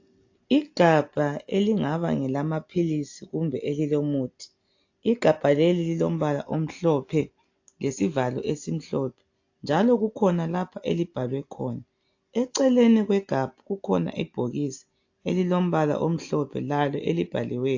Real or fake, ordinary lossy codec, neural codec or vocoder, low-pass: real; AAC, 48 kbps; none; 7.2 kHz